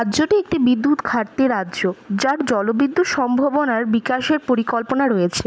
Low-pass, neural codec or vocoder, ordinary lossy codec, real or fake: none; none; none; real